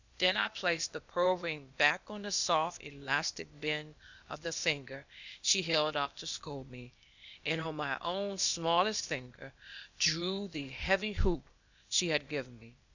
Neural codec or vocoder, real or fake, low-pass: codec, 16 kHz, 0.8 kbps, ZipCodec; fake; 7.2 kHz